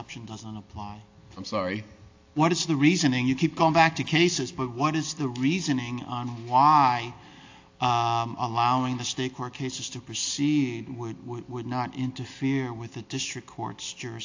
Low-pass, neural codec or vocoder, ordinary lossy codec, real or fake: 7.2 kHz; none; AAC, 48 kbps; real